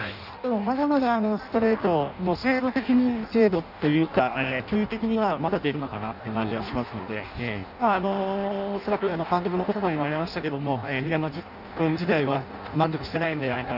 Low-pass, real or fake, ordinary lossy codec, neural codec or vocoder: 5.4 kHz; fake; none; codec, 16 kHz in and 24 kHz out, 0.6 kbps, FireRedTTS-2 codec